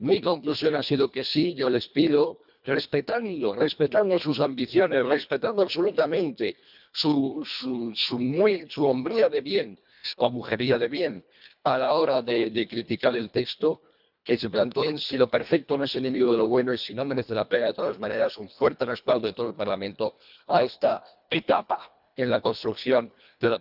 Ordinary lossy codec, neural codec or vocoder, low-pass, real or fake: none; codec, 24 kHz, 1.5 kbps, HILCodec; 5.4 kHz; fake